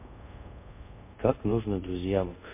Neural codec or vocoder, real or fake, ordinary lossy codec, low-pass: codec, 24 kHz, 0.5 kbps, DualCodec; fake; MP3, 24 kbps; 3.6 kHz